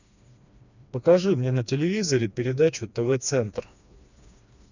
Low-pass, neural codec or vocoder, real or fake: 7.2 kHz; codec, 16 kHz, 2 kbps, FreqCodec, smaller model; fake